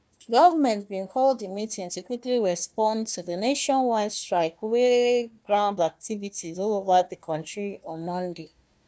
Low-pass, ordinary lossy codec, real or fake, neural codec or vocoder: none; none; fake; codec, 16 kHz, 1 kbps, FunCodec, trained on Chinese and English, 50 frames a second